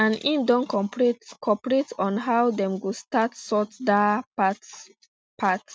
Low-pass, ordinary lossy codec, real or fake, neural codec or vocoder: none; none; real; none